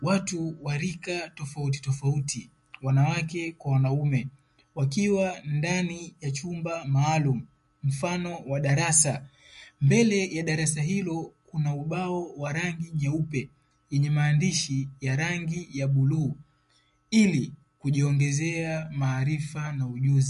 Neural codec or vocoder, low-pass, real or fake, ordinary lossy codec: none; 14.4 kHz; real; MP3, 48 kbps